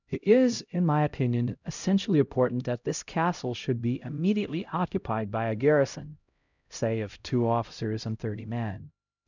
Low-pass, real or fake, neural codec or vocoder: 7.2 kHz; fake; codec, 16 kHz, 0.5 kbps, X-Codec, HuBERT features, trained on LibriSpeech